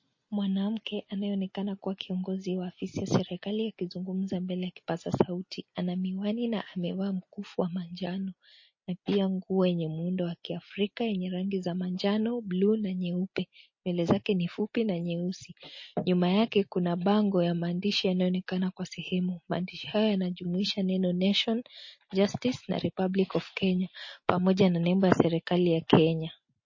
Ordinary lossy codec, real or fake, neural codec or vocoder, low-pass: MP3, 32 kbps; real; none; 7.2 kHz